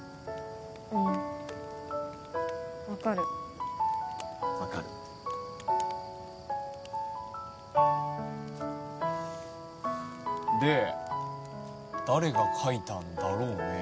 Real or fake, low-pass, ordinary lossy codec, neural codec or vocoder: real; none; none; none